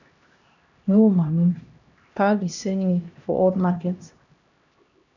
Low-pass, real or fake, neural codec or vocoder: 7.2 kHz; fake; codec, 16 kHz, 1 kbps, X-Codec, HuBERT features, trained on LibriSpeech